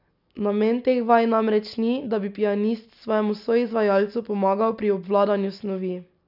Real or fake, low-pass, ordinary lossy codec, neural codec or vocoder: real; 5.4 kHz; none; none